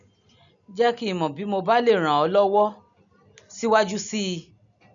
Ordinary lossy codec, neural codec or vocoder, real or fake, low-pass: none; none; real; 7.2 kHz